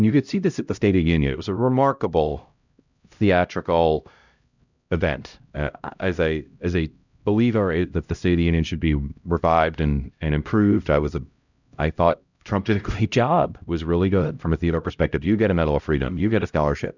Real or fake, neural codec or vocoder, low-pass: fake; codec, 16 kHz, 0.5 kbps, X-Codec, HuBERT features, trained on LibriSpeech; 7.2 kHz